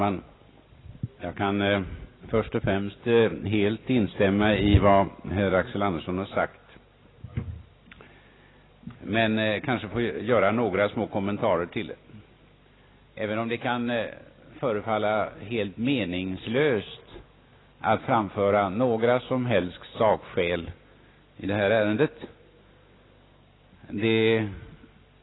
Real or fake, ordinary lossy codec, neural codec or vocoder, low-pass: real; AAC, 16 kbps; none; 7.2 kHz